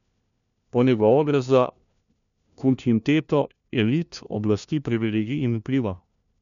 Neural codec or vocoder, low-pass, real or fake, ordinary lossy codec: codec, 16 kHz, 1 kbps, FunCodec, trained on LibriTTS, 50 frames a second; 7.2 kHz; fake; none